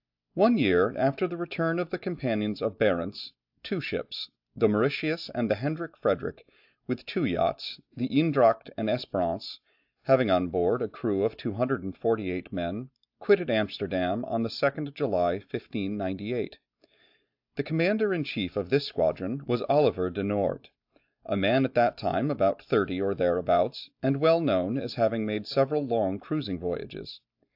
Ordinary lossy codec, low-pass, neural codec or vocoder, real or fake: AAC, 48 kbps; 5.4 kHz; none; real